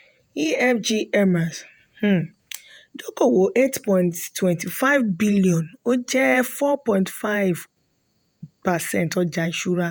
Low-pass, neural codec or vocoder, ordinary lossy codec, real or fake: none; vocoder, 48 kHz, 128 mel bands, Vocos; none; fake